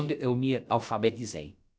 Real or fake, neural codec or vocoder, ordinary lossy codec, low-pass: fake; codec, 16 kHz, about 1 kbps, DyCAST, with the encoder's durations; none; none